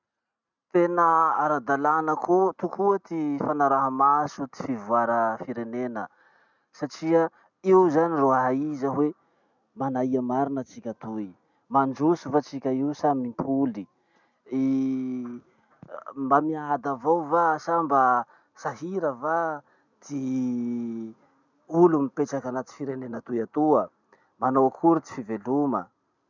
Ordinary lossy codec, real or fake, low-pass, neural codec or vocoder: none; real; 7.2 kHz; none